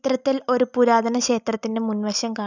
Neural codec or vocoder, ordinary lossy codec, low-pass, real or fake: none; none; 7.2 kHz; real